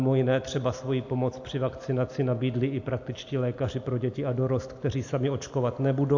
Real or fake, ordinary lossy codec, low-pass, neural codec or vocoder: real; AAC, 48 kbps; 7.2 kHz; none